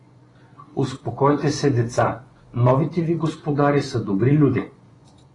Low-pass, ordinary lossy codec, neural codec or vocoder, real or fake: 10.8 kHz; AAC, 32 kbps; vocoder, 24 kHz, 100 mel bands, Vocos; fake